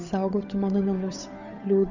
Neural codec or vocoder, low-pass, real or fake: codec, 16 kHz, 8 kbps, FunCodec, trained on Chinese and English, 25 frames a second; 7.2 kHz; fake